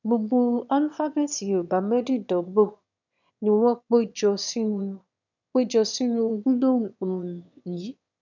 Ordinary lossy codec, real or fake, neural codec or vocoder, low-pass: none; fake; autoencoder, 22.05 kHz, a latent of 192 numbers a frame, VITS, trained on one speaker; 7.2 kHz